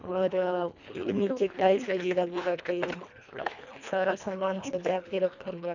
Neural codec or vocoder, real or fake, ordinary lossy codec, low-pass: codec, 24 kHz, 1.5 kbps, HILCodec; fake; none; 7.2 kHz